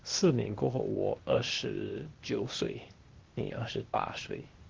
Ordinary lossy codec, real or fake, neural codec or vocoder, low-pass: Opus, 16 kbps; fake; codec, 16 kHz, 0.8 kbps, ZipCodec; 7.2 kHz